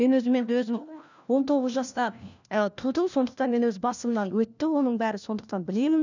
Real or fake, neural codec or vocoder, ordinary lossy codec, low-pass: fake; codec, 16 kHz, 1 kbps, FunCodec, trained on LibriTTS, 50 frames a second; none; 7.2 kHz